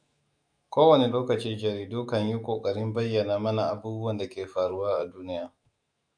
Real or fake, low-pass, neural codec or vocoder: fake; 9.9 kHz; autoencoder, 48 kHz, 128 numbers a frame, DAC-VAE, trained on Japanese speech